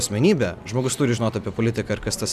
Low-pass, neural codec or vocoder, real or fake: 14.4 kHz; none; real